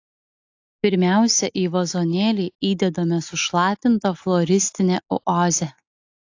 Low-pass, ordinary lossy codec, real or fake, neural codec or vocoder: 7.2 kHz; AAC, 48 kbps; real; none